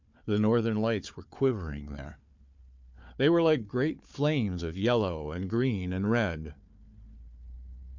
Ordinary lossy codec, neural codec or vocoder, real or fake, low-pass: MP3, 64 kbps; codec, 16 kHz, 4 kbps, FunCodec, trained on Chinese and English, 50 frames a second; fake; 7.2 kHz